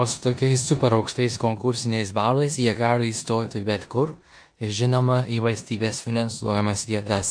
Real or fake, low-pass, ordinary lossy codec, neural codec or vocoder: fake; 9.9 kHz; AAC, 64 kbps; codec, 16 kHz in and 24 kHz out, 0.9 kbps, LongCat-Audio-Codec, four codebook decoder